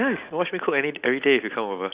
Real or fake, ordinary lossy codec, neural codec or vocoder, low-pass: real; Opus, 64 kbps; none; 3.6 kHz